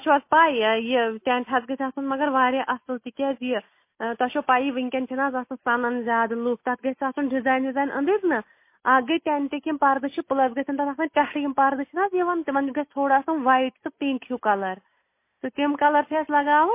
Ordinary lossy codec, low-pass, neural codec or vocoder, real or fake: MP3, 24 kbps; 3.6 kHz; none; real